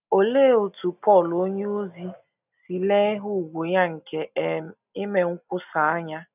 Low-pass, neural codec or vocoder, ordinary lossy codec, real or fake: 3.6 kHz; none; none; real